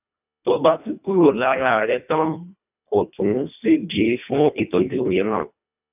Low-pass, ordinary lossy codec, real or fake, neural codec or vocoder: 3.6 kHz; none; fake; codec, 24 kHz, 1.5 kbps, HILCodec